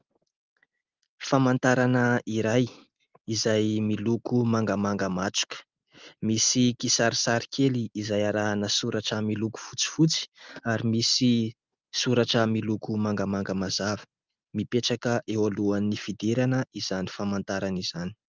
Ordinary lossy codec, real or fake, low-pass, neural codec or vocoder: Opus, 32 kbps; real; 7.2 kHz; none